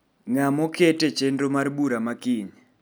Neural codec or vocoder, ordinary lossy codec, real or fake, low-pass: none; none; real; none